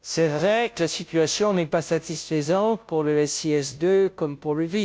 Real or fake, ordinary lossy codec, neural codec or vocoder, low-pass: fake; none; codec, 16 kHz, 0.5 kbps, FunCodec, trained on Chinese and English, 25 frames a second; none